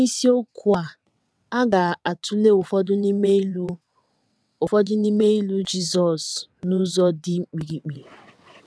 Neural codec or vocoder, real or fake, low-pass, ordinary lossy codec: vocoder, 22.05 kHz, 80 mel bands, WaveNeXt; fake; none; none